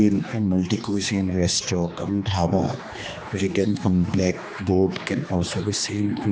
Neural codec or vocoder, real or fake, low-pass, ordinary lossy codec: codec, 16 kHz, 2 kbps, X-Codec, HuBERT features, trained on general audio; fake; none; none